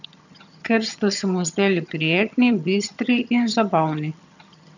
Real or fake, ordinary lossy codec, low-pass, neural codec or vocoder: fake; none; 7.2 kHz; vocoder, 22.05 kHz, 80 mel bands, HiFi-GAN